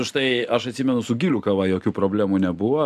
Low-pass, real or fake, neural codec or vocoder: 14.4 kHz; real; none